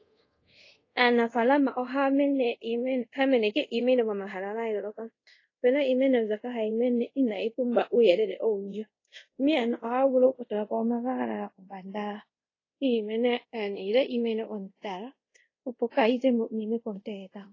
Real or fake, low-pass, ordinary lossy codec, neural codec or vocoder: fake; 7.2 kHz; AAC, 32 kbps; codec, 24 kHz, 0.5 kbps, DualCodec